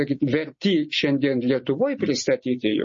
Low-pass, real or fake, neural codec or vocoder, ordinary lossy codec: 7.2 kHz; real; none; MP3, 32 kbps